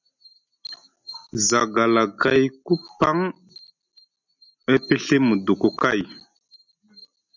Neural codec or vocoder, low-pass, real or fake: none; 7.2 kHz; real